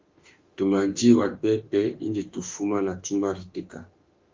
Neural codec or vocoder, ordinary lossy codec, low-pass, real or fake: autoencoder, 48 kHz, 32 numbers a frame, DAC-VAE, trained on Japanese speech; Opus, 32 kbps; 7.2 kHz; fake